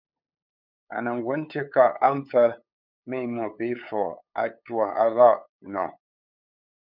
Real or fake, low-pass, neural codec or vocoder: fake; 5.4 kHz; codec, 16 kHz, 8 kbps, FunCodec, trained on LibriTTS, 25 frames a second